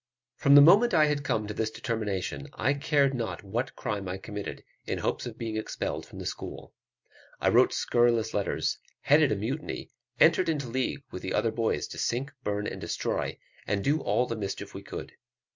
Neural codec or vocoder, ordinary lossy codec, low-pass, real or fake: none; MP3, 64 kbps; 7.2 kHz; real